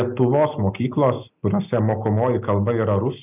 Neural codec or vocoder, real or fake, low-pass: none; real; 3.6 kHz